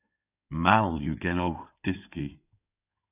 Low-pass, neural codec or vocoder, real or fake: 3.6 kHz; codec, 16 kHz in and 24 kHz out, 2.2 kbps, FireRedTTS-2 codec; fake